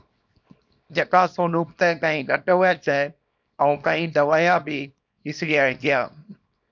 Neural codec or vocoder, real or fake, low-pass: codec, 24 kHz, 0.9 kbps, WavTokenizer, small release; fake; 7.2 kHz